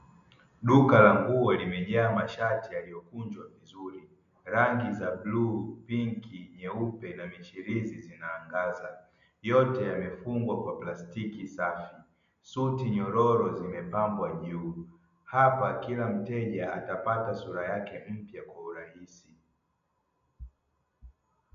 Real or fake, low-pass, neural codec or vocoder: real; 7.2 kHz; none